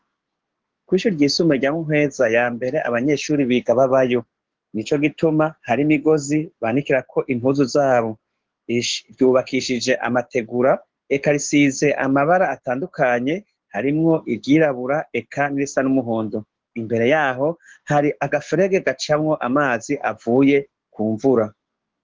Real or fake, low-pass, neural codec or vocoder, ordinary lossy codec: fake; 7.2 kHz; codec, 16 kHz, 6 kbps, DAC; Opus, 16 kbps